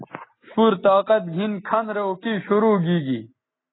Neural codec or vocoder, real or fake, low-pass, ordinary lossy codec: none; real; 7.2 kHz; AAC, 16 kbps